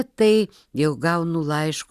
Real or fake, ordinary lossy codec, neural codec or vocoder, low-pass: real; Opus, 64 kbps; none; 14.4 kHz